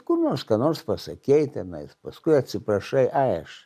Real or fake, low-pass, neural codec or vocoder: real; 14.4 kHz; none